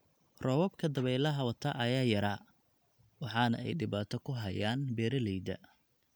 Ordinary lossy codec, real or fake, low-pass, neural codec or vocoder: none; real; none; none